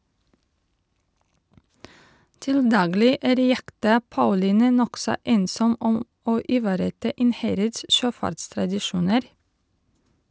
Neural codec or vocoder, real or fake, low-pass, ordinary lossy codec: none; real; none; none